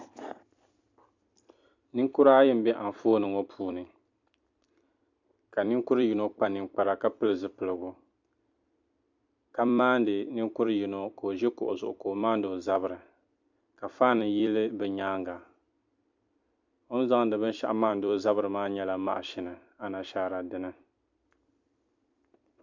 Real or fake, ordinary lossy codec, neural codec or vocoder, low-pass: fake; MP3, 64 kbps; vocoder, 44.1 kHz, 128 mel bands every 256 samples, BigVGAN v2; 7.2 kHz